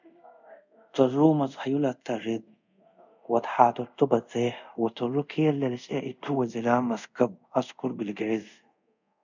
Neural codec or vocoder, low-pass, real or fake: codec, 24 kHz, 0.5 kbps, DualCodec; 7.2 kHz; fake